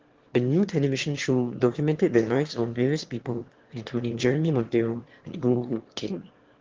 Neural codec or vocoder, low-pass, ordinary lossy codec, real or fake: autoencoder, 22.05 kHz, a latent of 192 numbers a frame, VITS, trained on one speaker; 7.2 kHz; Opus, 16 kbps; fake